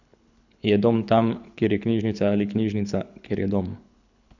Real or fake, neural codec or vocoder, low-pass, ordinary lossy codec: fake; codec, 24 kHz, 6 kbps, HILCodec; 7.2 kHz; none